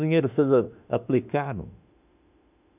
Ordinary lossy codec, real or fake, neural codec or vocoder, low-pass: none; fake; autoencoder, 48 kHz, 32 numbers a frame, DAC-VAE, trained on Japanese speech; 3.6 kHz